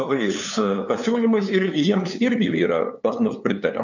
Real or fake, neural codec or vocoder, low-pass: fake; codec, 16 kHz, 2 kbps, FunCodec, trained on LibriTTS, 25 frames a second; 7.2 kHz